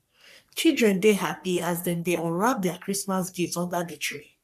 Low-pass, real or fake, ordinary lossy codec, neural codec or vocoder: 14.4 kHz; fake; none; codec, 44.1 kHz, 3.4 kbps, Pupu-Codec